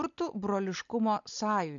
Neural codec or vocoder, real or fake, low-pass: none; real; 7.2 kHz